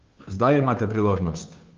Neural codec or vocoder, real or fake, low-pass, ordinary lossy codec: codec, 16 kHz, 2 kbps, FunCodec, trained on Chinese and English, 25 frames a second; fake; 7.2 kHz; Opus, 24 kbps